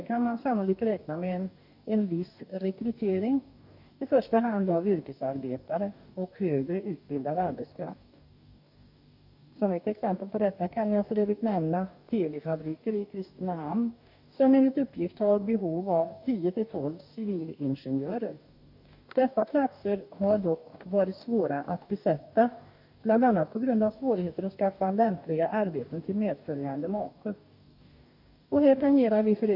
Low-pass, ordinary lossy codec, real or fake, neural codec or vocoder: 5.4 kHz; none; fake; codec, 44.1 kHz, 2.6 kbps, DAC